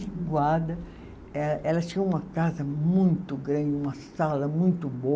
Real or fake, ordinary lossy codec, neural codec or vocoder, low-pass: real; none; none; none